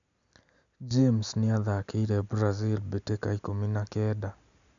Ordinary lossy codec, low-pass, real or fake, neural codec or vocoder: none; 7.2 kHz; real; none